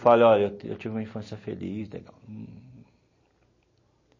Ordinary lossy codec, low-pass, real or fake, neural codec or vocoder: MP3, 32 kbps; 7.2 kHz; real; none